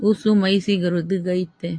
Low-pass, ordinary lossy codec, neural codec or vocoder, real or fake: 9.9 kHz; AAC, 48 kbps; none; real